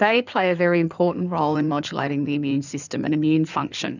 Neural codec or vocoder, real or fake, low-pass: codec, 16 kHz in and 24 kHz out, 2.2 kbps, FireRedTTS-2 codec; fake; 7.2 kHz